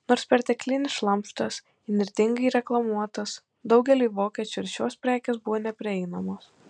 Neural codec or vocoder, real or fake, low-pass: none; real; 9.9 kHz